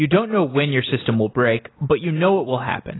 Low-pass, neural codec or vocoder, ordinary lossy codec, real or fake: 7.2 kHz; none; AAC, 16 kbps; real